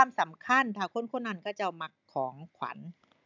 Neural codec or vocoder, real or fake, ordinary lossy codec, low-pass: none; real; none; 7.2 kHz